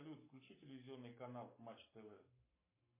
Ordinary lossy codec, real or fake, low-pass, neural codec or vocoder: MP3, 24 kbps; fake; 3.6 kHz; codec, 44.1 kHz, 7.8 kbps, Pupu-Codec